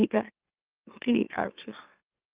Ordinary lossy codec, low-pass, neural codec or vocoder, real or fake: Opus, 32 kbps; 3.6 kHz; autoencoder, 44.1 kHz, a latent of 192 numbers a frame, MeloTTS; fake